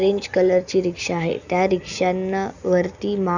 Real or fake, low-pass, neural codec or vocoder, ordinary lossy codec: real; 7.2 kHz; none; none